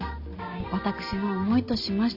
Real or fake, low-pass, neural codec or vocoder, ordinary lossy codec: real; 5.4 kHz; none; Opus, 64 kbps